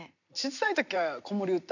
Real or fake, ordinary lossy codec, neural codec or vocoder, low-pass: fake; none; vocoder, 44.1 kHz, 128 mel bands every 256 samples, BigVGAN v2; 7.2 kHz